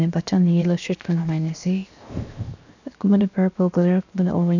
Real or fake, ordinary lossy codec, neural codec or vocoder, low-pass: fake; none; codec, 16 kHz, 0.7 kbps, FocalCodec; 7.2 kHz